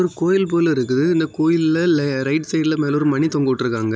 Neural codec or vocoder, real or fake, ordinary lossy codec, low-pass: none; real; none; none